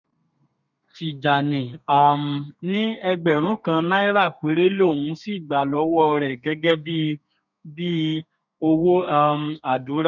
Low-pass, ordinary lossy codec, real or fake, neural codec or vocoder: 7.2 kHz; none; fake; codec, 32 kHz, 1.9 kbps, SNAC